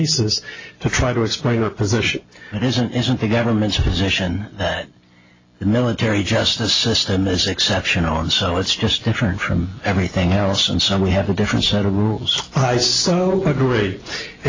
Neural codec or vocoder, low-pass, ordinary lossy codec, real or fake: none; 7.2 kHz; AAC, 32 kbps; real